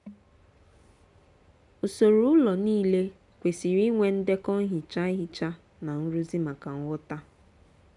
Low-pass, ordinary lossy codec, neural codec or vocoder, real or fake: 10.8 kHz; none; none; real